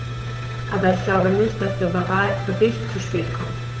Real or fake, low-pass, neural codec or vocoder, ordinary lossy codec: fake; none; codec, 16 kHz, 8 kbps, FunCodec, trained on Chinese and English, 25 frames a second; none